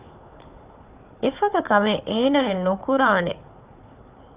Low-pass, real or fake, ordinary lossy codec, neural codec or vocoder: 3.6 kHz; fake; Opus, 64 kbps; codec, 16 kHz, 4 kbps, FunCodec, trained on Chinese and English, 50 frames a second